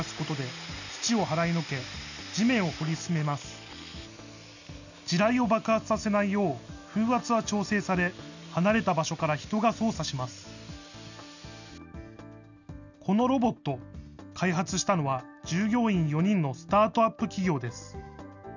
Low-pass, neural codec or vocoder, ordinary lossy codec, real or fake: 7.2 kHz; none; none; real